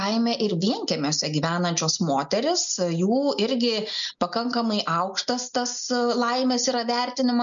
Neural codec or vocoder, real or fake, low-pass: none; real; 7.2 kHz